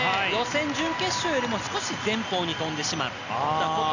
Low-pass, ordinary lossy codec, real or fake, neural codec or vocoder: 7.2 kHz; none; real; none